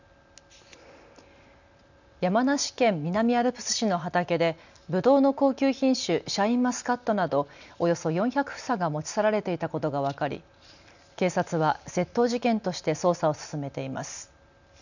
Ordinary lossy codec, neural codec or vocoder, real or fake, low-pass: none; none; real; 7.2 kHz